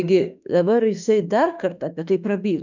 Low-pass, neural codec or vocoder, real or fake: 7.2 kHz; autoencoder, 48 kHz, 32 numbers a frame, DAC-VAE, trained on Japanese speech; fake